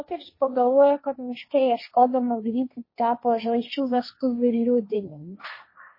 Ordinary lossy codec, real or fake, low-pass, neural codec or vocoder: MP3, 24 kbps; fake; 5.4 kHz; codec, 16 kHz, 1.1 kbps, Voila-Tokenizer